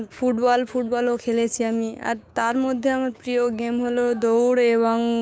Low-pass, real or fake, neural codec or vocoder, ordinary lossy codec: none; fake; codec, 16 kHz, 6 kbps, DAC; none